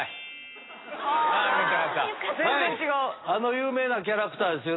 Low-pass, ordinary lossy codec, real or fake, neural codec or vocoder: 7.2 kHz; AAC, 16 kbps; real; none